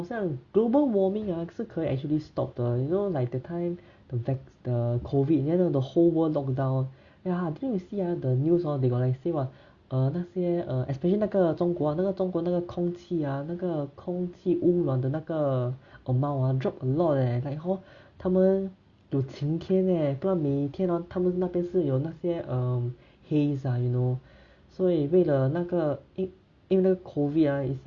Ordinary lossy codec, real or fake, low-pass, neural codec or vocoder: Opus, 64 kbps; real; 7.2 kHz; none